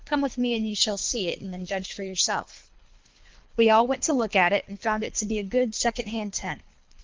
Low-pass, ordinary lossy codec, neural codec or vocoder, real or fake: 7.2 kHz; Opus, 24 kbps; codec, 24 kHz, 3 kbps, HILCodec; fake